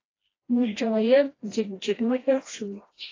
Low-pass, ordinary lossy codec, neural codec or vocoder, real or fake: 7.2 kHz; AAC, 32 kbps; codec, 16 kHz, 1 kbps, FreqCodec, smaller model; fake